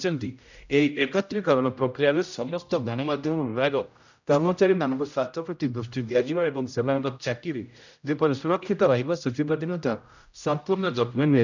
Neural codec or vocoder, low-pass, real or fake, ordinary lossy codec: codec, 16 kHz, 0.5 kbps, X-Codec, HuBERT features, trained on general audio; 7.2 kHz; fake; none